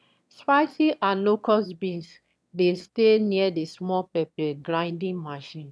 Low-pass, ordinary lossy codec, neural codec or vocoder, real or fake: none; none; autoencoder, 22.05 kHz, a latent of 192 numbers a frame, VITS, trained on one speaker; fake